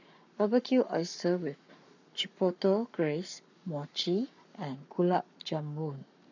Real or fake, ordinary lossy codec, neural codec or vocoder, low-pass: fake; none; codec, 44.1 kHz, 7.8 kbps, Pupu-Codec; 7.2 kHz